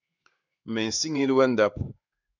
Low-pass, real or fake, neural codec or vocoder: 7.2 kHz; fake; codec, 16 kHz in and 24 kHz out, 1 kbps, XY-Tokenizer